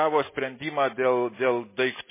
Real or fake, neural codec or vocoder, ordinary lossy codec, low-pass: real; none; MP3, 16 kbps; 3.6 kHz